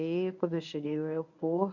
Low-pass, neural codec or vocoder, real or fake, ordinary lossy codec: 7.2 kHz; codec, 24 kHz, 0.9 kbps, WavTokenizer, medium speech release version 1; fake; none